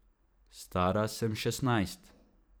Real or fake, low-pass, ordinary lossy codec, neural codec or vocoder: fake; none; none; vocoder, 44.1 kHz, 128 mel bands every 512 samples, BigVGAN v2